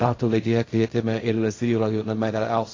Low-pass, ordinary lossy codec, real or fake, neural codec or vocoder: 7.2 kHz; AAC, 32 kbps; fake; codec, 16 kHz in and 24 kHz out, 0.4 kbps, LongCat-Audio-Codec, fine tuned four codebook decoder